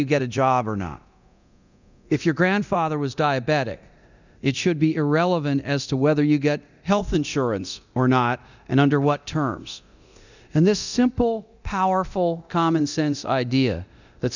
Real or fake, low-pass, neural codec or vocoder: fake; 7.2 kHz; codec, 24 kHz, 0.9 kbps, DualCodec